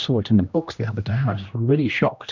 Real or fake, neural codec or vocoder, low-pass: fake; codec, 16 kHz, 1 kbps, X-Codec, HuBERT features, trained on general audio; 7.2 kHz